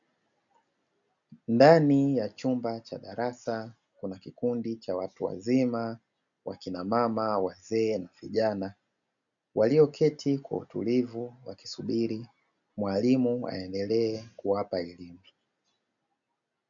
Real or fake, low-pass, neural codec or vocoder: real; 7.2 kHz; none